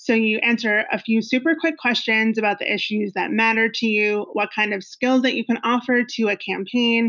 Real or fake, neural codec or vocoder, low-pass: real; none; 7.2 kHz